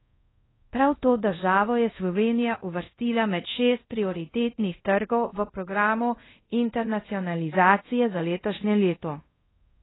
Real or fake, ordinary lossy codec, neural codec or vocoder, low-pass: fake; AAC, 16 kbps; codec, 24 kHz, 0.5 kbps, DualCodec; 7.2 kHz